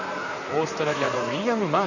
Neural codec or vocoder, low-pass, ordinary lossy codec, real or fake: vocoder, 44.1 kHz, 128 mel bands, Pupu-Vocoder; 7.2 kHz; none; fake